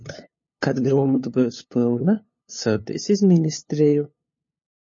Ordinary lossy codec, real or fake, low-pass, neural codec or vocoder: MP3, 32 kbps; fake; 7.2 kHz; codec, 16 kHz, 2 kbps, FunCodec, trained on LibriTTS, 25 frames a second